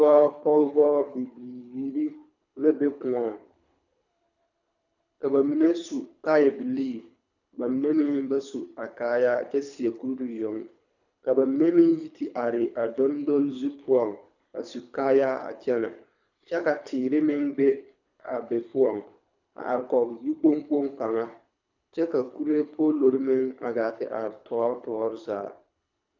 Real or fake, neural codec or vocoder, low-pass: fake; codec, 24 kHz, 3 kbps, HILCodec; 7.2 kHz